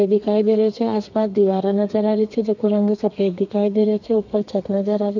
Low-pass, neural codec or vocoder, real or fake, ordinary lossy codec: 7.2 kHz; codec, 32 kHz, 1.9 kbps, SNAC; fake; none